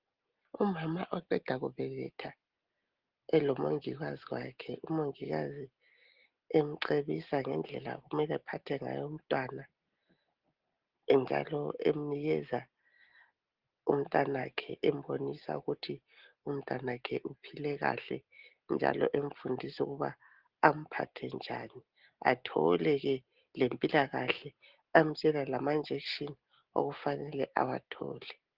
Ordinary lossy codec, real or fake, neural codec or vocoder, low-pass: Opus, 16 kbps; real; none; 5.4 kHz